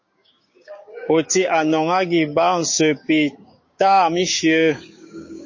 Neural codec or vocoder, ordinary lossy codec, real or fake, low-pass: codec, 16 kHz, 6 kbps, DAC; MP3, 32 kbps; fake; 7.2 kHz